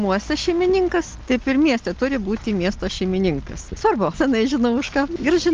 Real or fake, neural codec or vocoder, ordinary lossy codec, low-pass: real; none; Opus, 24 kbps; 7.2 kHz